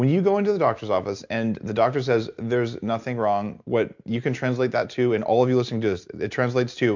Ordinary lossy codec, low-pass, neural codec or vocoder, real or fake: MP3, 64 kbps; 7.2 kHz; none; real